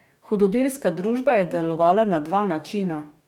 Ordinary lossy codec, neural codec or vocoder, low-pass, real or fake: none; codec, 44.1 kHz, 2.6 kbps, DAC; 19.8 kHz; fake